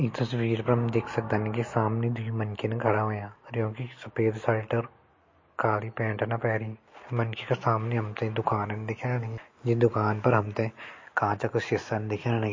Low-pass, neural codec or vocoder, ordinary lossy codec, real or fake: 7.2 kHz; none; MP3, 32 kbps; real